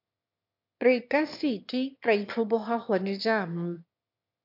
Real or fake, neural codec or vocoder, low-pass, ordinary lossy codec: fake; autoencoder, 22.05 kHz, a latent of 192 numbers a frame, VITS, trained on one speaker; 5.4 kHz; MP3, 48 kbps